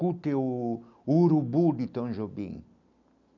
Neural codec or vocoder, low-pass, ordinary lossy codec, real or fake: none; 7.2 kHz; none; real